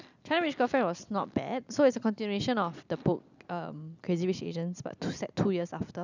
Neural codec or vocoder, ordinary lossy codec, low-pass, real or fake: none; none; 7.2 kHz; real